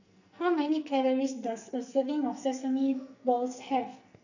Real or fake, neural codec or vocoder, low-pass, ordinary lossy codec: fake; codec, 44.1 kHz, 2.6 kbps, SNAC; 7.2 kHz; none